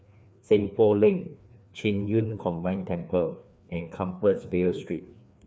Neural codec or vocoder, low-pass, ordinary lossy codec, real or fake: codec, 16 kHz, 2 kbps, FreqCodec, larger model; none; none; fake